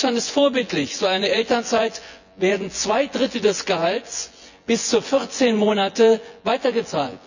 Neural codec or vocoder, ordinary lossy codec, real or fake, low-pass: vocoder, 24 kHz, 100 mel bands, Vocos; none; fake; 7.2 kHz